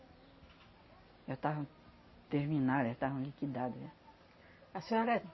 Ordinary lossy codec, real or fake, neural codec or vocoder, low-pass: MP3, 24 kbps; real; none; 7.2 kHz